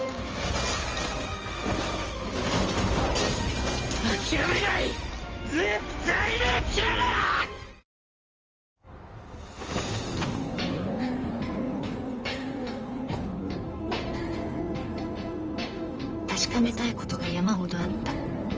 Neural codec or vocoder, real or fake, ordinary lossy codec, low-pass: codec, 16 kHz in and 24 kHz out, 2.2 kbps, FireRedTTS-2 codec; fake; Opus, 24 kbps; 7.2 kHz